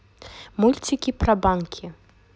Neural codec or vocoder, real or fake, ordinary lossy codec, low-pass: none; real; none; none